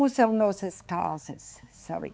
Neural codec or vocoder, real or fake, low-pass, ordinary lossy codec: codec, 16 kHz, 4 kbps, X-Codec, HuBERT features, trained on balanced general audio; fake; none; none